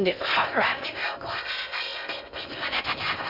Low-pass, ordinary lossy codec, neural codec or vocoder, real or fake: 5.4 kHz; none; codec, 16 kHz in and 24 kHz out, 0.6 kbps, FocalCodec, streaming, 2048 codes; fake